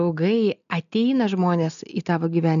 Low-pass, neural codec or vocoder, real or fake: 7.2 kHz; none; real